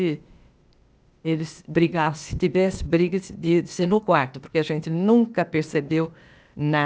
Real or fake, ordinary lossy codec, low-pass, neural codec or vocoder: fake; none; none; codec, 16 kHz, 0.8 kbps, ZipCodec